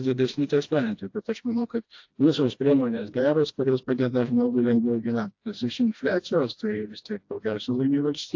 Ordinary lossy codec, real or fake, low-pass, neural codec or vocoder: AAC, 48 kbps; fake; 7.2 kHz; codec, 16 kHz, 1 kbps, FreqCodec, smaller model